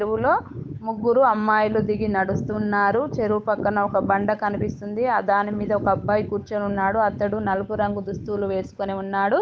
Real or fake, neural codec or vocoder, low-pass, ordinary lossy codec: fake; codec, 16 kHz, 8 kbps, FunCodec, trained on Chinese and English, 25 frames a second; none; none